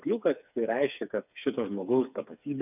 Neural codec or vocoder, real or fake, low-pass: codec, 16 kHz, 4 kbps, FreqCodec, smaller model; fake; 3.6 kHz